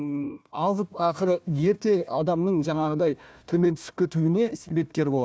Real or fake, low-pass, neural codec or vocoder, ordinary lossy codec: fake; none; codec, 16 kHz, 1 kbps, FunCodec, trained on Chinese and English, 50 frames a second; none